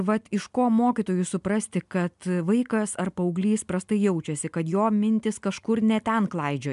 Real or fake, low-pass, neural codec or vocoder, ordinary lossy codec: real; 10.8 kHz; none; MP3, 96 kbps